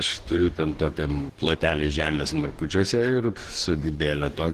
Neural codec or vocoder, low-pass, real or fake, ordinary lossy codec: codec, 44.1 kHz, 2.6 kbps, DAC; 14.4 kHz; fake; Opus, 16 kbps